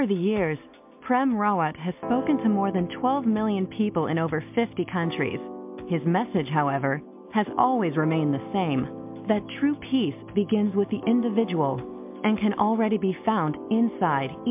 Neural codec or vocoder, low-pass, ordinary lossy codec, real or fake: none; 3.6 kHz; MP3, 32 kbps; real